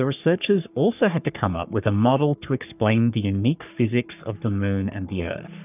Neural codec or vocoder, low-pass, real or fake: codec, 44.1 kHz, 3.4 kbps, Pupu-Codec; 3.6 kHz; fake